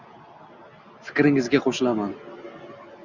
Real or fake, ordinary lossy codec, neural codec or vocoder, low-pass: real; Opus, 64 kbps; none; 7.2 kHz